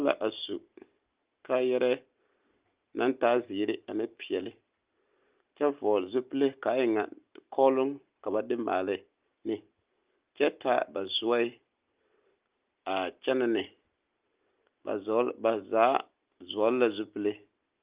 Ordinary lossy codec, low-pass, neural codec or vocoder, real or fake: Opus, 32 kbps; 3.6 kHz; none; real